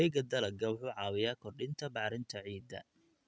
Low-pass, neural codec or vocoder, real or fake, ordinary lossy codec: none; none; real; none